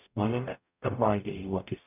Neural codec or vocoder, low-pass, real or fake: codec, 44.1 kHz, 0.9 kbps, DAC; 3.6 kHz; fake